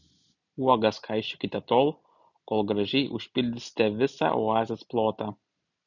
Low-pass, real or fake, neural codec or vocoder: 7.2 kHz; real; none